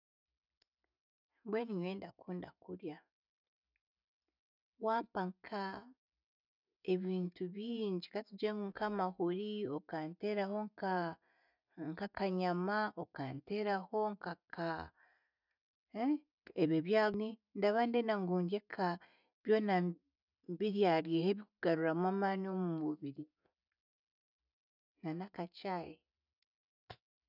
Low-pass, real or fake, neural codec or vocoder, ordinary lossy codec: 5.4 kHz; real; none; none